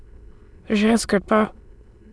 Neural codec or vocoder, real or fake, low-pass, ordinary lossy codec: autoencoder, 22.05 kHz, a latent of 192 numbers a frame, VITS, trained on many speakers; fake; none; none